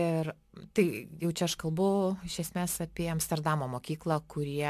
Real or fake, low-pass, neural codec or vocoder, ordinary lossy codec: real; 14.4 kHz; none; AAC, 96 kbps